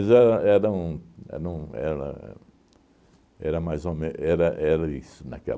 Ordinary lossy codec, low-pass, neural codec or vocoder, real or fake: none; none; none; real